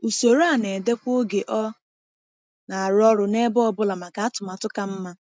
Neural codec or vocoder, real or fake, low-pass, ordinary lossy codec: none; real; none; none